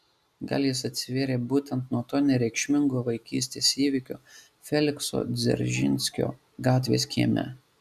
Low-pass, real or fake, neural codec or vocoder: 14.4 kHz; real; none